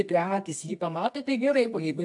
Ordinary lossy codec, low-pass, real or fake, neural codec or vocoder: AAC, 64 kbps; 10.8 kHz; fake; codec, 24 kHz, 0.9 kbps, WavTokenizer, medium music audio release